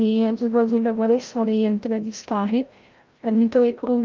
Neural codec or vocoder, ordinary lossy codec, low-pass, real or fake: codec, 16 kHz, 0.5 kbps, FreqCodec, larger model; Opus, 32 kbps; 7.2 kHz; fake